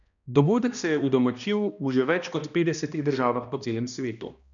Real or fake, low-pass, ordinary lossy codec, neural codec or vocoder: fake; 7.2 kHz; none; codec, 16 kHz, 1 kbps, X-Codec, HuBERT features, trained on balanced general audio